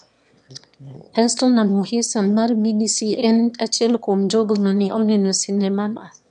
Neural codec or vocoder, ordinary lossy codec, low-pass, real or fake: autoencoder, 22.05 kHz, a latent of 192 numbers a frame, VITS, trained on one speaker; none; 9.9 kHz; fake